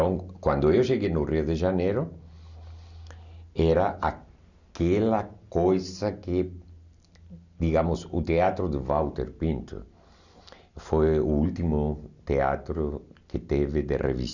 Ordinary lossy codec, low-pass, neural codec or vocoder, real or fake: none; 7.2 kHz; none; real